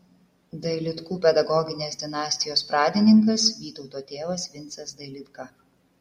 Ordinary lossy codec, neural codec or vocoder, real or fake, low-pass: MP3, 64 kbps; none; real; 19.8 kHz